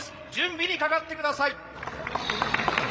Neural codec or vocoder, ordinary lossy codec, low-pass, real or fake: codec, 16 kHz, 16 kbps, FreqCodec, larger model; none; none; fake